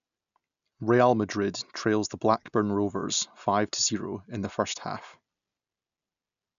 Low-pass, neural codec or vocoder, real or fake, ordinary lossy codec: 7.2 kHz; none; real; none